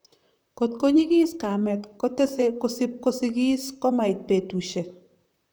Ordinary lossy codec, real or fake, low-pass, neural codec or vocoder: none; fake; none; vocoder, 44.1 kHz, 128 mel bands, Pupu-Vocoder